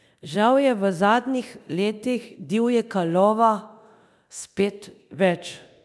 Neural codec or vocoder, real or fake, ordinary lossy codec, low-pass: codec, 24 kHz, 0.9 kbps, DualCodec; fake; none; none